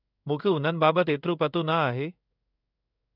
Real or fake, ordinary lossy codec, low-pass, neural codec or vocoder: fake; none; 5.4 kHz; codec, 16 kHz in and 24 kHz out, 1 kbps, XY-Tokenizer